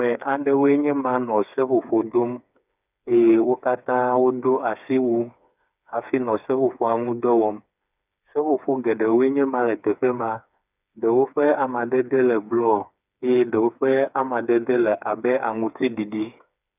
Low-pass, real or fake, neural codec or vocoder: 3.6 kHz; fake; codec, 16 kHz, 4 kbps, FreqCodec, smaller model